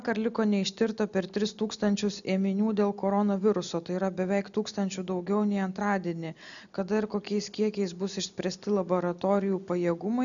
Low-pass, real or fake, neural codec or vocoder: 7.2 kHz; real; none